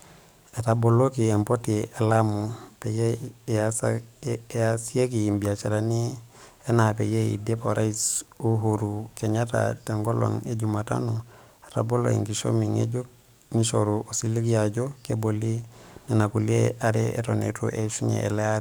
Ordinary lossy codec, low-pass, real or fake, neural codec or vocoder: none; none; fake; codec, 44.1 kHz, 7.8 kbps, DAC